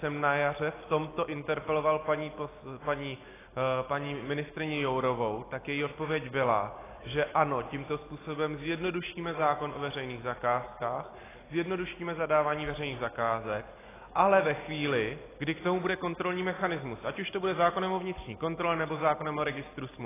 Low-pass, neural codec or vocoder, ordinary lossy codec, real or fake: 3.6 kHz; none; AAC, 16 kbps; real